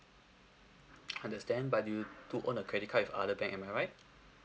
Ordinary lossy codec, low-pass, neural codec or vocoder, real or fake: none; none; none; real